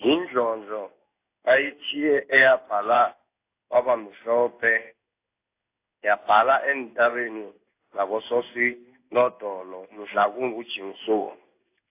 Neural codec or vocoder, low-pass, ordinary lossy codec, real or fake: codec, 16 kHz in and 24 kHz out, 1 kbps, XY-Tokenizer; 3.6 kHz; AAC, 24 kbps; fake